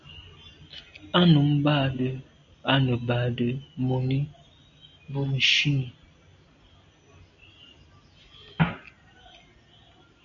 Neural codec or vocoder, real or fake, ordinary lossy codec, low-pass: none; real; MP3, 96 kbps; 7.2 kHz